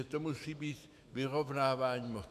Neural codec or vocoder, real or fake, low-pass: autoencoder, 48 kHz, 128 numbers a frame, DAC-VAE, trained on Japanese speech; fake; 14.4 kHz